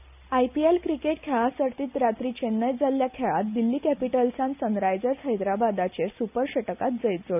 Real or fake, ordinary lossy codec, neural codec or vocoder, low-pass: real; none; none; 3.6 kHz